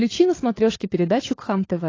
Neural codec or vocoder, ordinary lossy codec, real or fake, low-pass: codec, 16 kHz, 16 kbps, FunCodec, trained on LibriTTS, 50 frames a second; AAC, 32 kbps; fake; 7.2 kHz